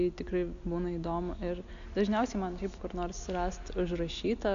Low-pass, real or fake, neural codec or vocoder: 7.2 kHz; real; none